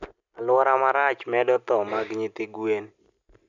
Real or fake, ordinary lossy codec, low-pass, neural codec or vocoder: real; none; 7.2 kHz; none